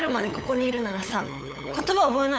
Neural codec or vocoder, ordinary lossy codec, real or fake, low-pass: codec, 16 kHz, 8 kbps, FunCodec, trained on LibriTTS, 25 frames a second; none; fake; none